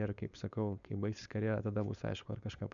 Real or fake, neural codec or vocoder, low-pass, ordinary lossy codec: fake; codec, 16 kHz, 4.8 kbps, FACodec; 7.2 kHz; MP3, 96 kbps